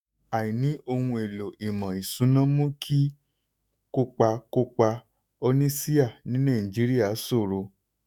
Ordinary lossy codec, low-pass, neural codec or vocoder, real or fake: none; 19.8 kHz; autoencoder, 48 kHz, 128 numbers a frame, DAC-VAE, trained on Japanese speech; fake